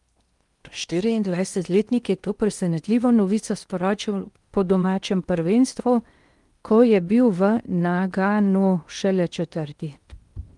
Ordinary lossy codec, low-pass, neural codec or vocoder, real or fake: Opus, 32 kbps; 10.8 kHz; codec, 16 kHz in and 24 kHz out, 0.8 kbps, FocalCodec, streaming, 65536 codes; fake